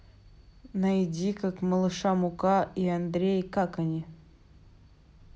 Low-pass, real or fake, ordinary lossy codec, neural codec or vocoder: none; real; none; none